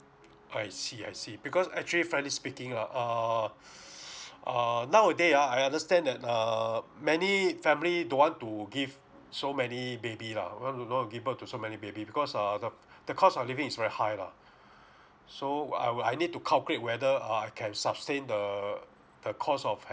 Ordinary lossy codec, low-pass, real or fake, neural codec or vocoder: none; none; real; none